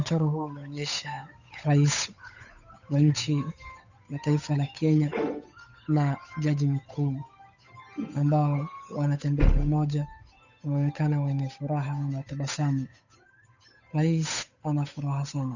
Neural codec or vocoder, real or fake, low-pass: codec, 16 kHz, 8 kbps, FunCodec, trained on Chinese and English, 25 frames a second; fake; 7.2 kHz